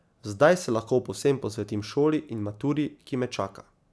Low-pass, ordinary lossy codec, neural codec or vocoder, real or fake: none; none; none; real